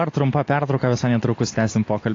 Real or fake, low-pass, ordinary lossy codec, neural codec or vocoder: real; 7.2 kHz; AAC, 32 kbps; none